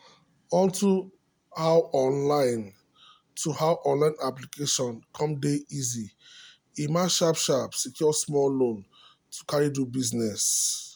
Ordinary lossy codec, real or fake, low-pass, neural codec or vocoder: none; real; none; none